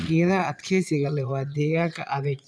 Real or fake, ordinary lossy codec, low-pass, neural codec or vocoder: fake; none; none; vocoder, 22.05 kHz, 80 mel bands, Vocos